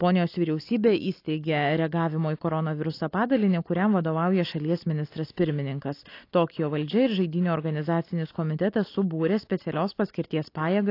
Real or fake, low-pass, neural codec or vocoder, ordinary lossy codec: real; 5.4 kHz; none; AAC, 32 kbps